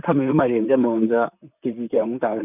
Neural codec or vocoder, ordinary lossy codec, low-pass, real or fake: vocoder, 44.1 kHz, 128 mel bands, Pupu-Vocoder; none; 3.6 kHz; fake